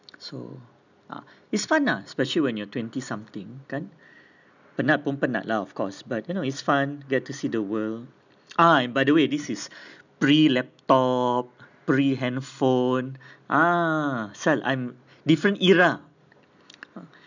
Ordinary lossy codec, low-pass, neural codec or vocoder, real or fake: none; 7.2 kHz; none; real